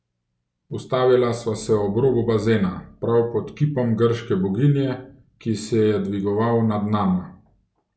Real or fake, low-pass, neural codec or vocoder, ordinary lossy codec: real; none; none; none